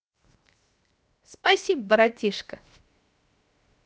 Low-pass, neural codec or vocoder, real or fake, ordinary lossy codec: none; codec, 16 kHz, 0.7 kbps, FocalCodec; fake; none